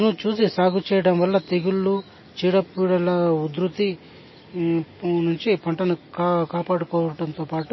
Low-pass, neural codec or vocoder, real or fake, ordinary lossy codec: 7.2 kHz; none; real; MP3, 24 kbps